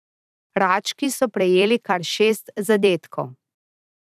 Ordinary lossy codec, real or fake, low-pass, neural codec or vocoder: none; fake; 14.4 kHz; vocoder, 44.1 kHz, 128 mel bands every 256 samples, BigVGAN v2